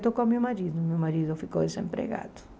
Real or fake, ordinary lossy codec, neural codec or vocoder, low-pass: real; none; none; none